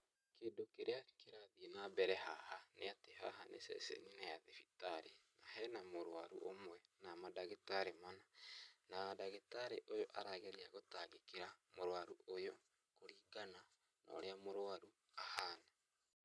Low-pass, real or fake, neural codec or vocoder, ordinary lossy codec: none; real; none; none